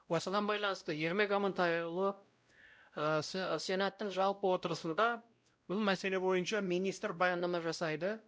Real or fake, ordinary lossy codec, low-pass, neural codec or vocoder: fake; none; none; codec, 16 kHz, 0.5 kbps, X-Codec, WavLM features, trained on Multilingual LibriSpeech